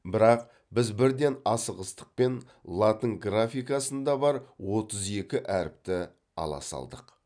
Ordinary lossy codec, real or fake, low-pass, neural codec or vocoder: none; real; 9.9 kHz; none